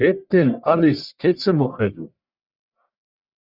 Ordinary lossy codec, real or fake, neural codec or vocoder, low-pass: Opus, 64 kbps; fake; codec, 44.1 kHz, 1.7 kbps, Pupu-Codec; 5.4 kHz